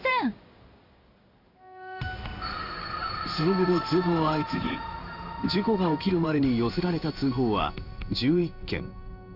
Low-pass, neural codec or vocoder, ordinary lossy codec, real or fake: 5.4 kHz; codec, 16 kHz in and 24 kHz out, 1 kbps, XY-Tokenizer; none; fake